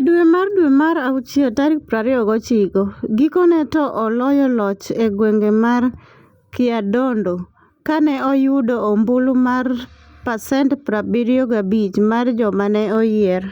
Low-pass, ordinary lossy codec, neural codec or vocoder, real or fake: 19.8 kHz; Opus, 64 kbps; none; real